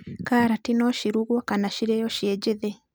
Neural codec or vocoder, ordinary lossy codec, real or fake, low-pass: none; none; real; none